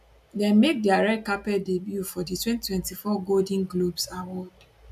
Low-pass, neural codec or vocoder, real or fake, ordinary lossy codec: 14.4 kHz; none; real; none